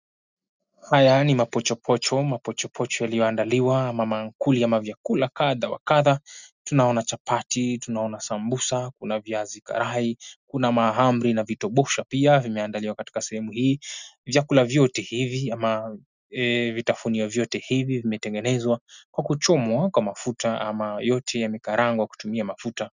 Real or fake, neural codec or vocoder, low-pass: real; none; 7.2 kHz